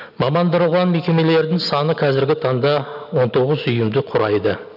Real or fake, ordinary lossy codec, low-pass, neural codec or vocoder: real; none; 5.4 kHz; none